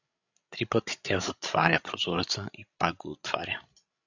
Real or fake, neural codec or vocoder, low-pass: fake; codec, 16 kHz, 16 kbps, FreqCodec, larger model; 7.2 kHz